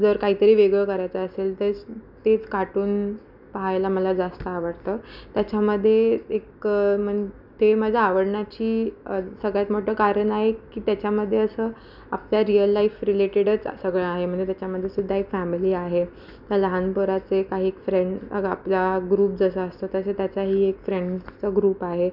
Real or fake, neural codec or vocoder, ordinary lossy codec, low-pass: real; none; none; 5.4 kHz